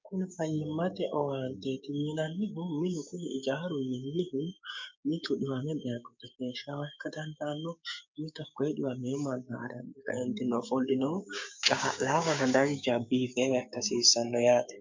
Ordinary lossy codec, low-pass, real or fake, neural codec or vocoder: MP3, 64 kbps; 7.2 kHz; fake; codec, 16 kHz, 6 kbps, DAC